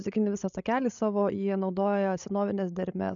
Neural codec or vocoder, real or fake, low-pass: codec, 16 kHz, 16 kbps, FreqCodec, larger model; fake; 7.2 kHz